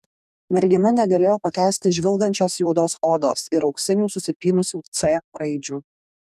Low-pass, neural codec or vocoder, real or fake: 14.4 kHz; codec, 44.1 kHz, 2.6 kbps, DAC; fake